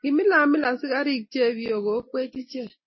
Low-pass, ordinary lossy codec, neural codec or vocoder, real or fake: 7.2 kHz; MP3, 24 kbps; none; real